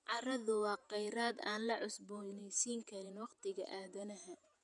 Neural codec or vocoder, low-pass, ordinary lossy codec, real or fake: vocoder, 48 kHz, 128 mel bands, Vocos; 10.8 kHz; none; fake